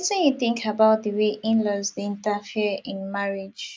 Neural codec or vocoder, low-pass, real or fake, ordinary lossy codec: none; none; real; none